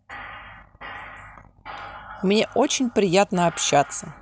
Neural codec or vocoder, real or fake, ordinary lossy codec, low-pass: none; real; none; none